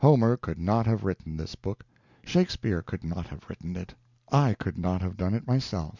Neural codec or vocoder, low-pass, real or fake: none; 7.2 kHz; real